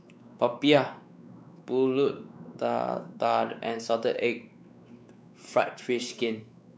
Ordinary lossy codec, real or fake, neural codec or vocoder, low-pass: none; fake; codec, 16 kHz, 4 kbps, X-Codec, WavLM features, trained on Multilingual LibriSpeech; none